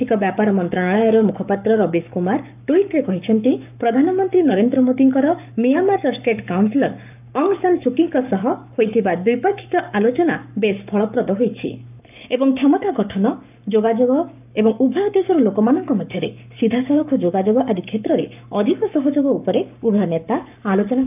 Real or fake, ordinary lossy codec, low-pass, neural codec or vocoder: fake; none; 3.6 kHz; codec, 44.1 kHz, 7.8 kbps, Pupu-Codec